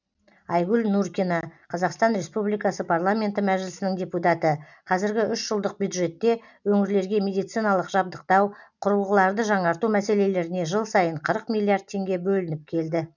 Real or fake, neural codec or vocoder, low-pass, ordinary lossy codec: real; none; 7.2 kHz; none